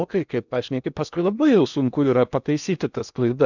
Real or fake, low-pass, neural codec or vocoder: fake; 7.2 kHz; codec, 16 kHz in and 24 kHz out, 0.8 kbps, FocalCodec, streaming, 65536 codes